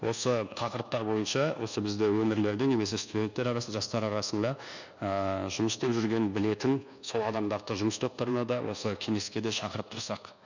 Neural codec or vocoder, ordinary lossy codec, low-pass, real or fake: codec, 24 kHz, 1.2 kbps, DualCodec; none; 7.2 kHz; fake